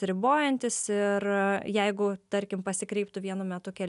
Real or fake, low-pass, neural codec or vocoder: real; 10.8 kHz; none